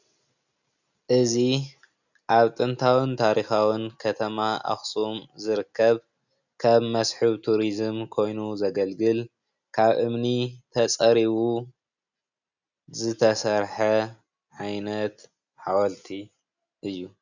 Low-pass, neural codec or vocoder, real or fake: 7.2 kHz; none; real